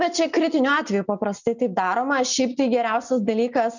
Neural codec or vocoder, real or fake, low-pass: none; real; 7.2 kHz